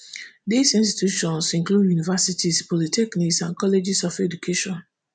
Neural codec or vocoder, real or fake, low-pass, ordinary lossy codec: none; real; 9.9 kHz; none